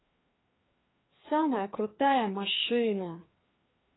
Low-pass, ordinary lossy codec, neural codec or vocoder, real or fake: 7.2 kHz; AAC, 16 kbps; codec, 16 kHz, 2 kbps, FreqCodec, larger model; fake